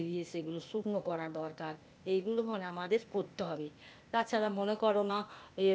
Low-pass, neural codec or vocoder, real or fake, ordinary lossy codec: none; codec, 16 kHz, 0.8 kbps, ZipCodec; fake; none